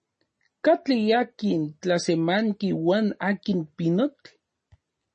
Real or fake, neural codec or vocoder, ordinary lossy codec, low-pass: real; none; MP3, 32 kbps; 10.8 kHz